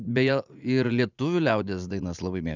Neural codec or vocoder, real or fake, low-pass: vocoder, 44.1 kHz, 80 mel bands, Vocos; fake; 7.2 kHz